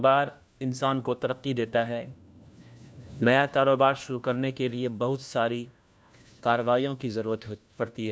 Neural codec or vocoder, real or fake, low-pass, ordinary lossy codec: codec, 16 kHz, 1 kbps, FunCodec, trained on LibriTTS, 50 frames a second; fake; none; none